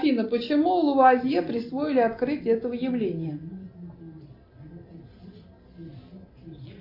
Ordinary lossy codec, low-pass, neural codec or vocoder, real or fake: AAC, 32 kbps; 5.4 kHz; none; real